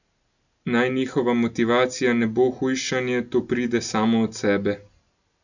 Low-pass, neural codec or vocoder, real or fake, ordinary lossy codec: 7.2 kHz; none; real; none